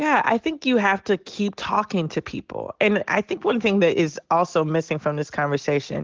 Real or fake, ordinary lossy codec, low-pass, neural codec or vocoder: real; Opus, 16 kbps; 7.2 kHz; none